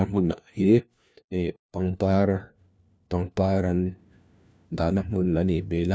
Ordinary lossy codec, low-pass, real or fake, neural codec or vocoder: none; none; fake; codec, 16 kHz, 1 kbps, FunCodec, trained on LibriTTS, 50 frames a second